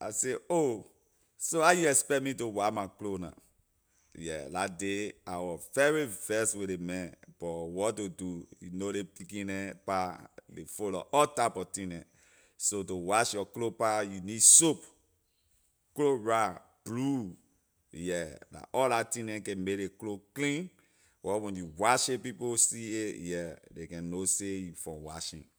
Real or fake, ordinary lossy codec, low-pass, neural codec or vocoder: real; none; none; none